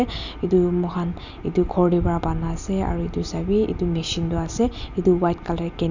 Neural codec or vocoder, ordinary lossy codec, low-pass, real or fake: none; none; 7.2 kHz; real